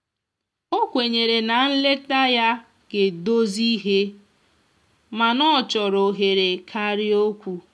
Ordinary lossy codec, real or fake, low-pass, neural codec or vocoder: none; real; none; none